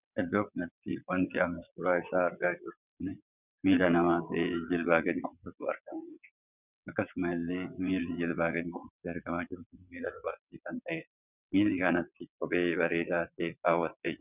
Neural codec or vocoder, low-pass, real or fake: vocoder, 22.05 kHz, 80 mel bands, WaveNeXt; 3.6 kHz; fake